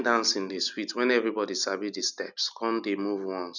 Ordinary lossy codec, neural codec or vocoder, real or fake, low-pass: none; none; real; 7.2 kHz